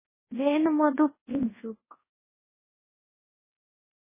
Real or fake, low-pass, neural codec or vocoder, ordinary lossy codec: fake; 3.6 kHz; codec, 24 kHz, 0.9 kbps, WavTokenizer, large speech release; MP3, 16 kbps